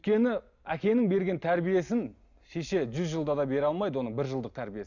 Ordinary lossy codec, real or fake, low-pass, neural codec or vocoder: none; real; 7.2 kHz; none